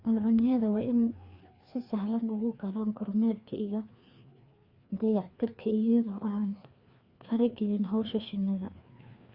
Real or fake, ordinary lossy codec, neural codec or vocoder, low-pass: fake; Opus, 64 kbps; codec, 16 kHz, 2 kbps, FreqCodec, larger model; 5.4 kHz